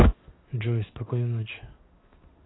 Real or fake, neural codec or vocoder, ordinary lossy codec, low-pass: fake; codec, 16 kHz in and 24 kHz out, 1 kbps, XY-Tokenizer; AAC, 16 kbps; 7.2 kHz